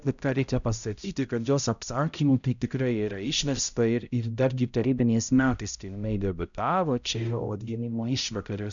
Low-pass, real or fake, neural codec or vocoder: 7.2 kHz; fake; codec, 16 kHz, 0.5 kbps, X-Codec, HuBERT features, trained on balanced general audio